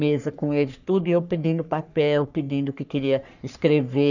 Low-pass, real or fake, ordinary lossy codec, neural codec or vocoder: 7.2 kHz; fake; none; codec, 44.1 kHz, 3.4 kbps, Pupu-Codec